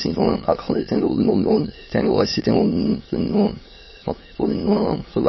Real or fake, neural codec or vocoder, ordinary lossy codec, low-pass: fake; autoencoder, 22.05 kHz, a latent of 192 numbers a frame, VITS, trained on many speakers; MP3, 24 kbps; 7.2 kHz